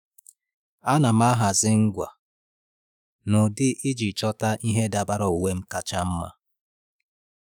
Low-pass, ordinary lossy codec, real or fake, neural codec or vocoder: none; none; fake; autoencoder, 48 kHz, 128 numbers a frame, DAC-VAE, trained on Japanese speech